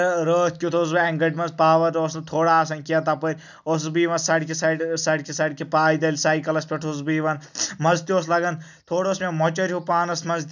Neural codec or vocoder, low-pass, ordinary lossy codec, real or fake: none; 7.2 kHz; none; real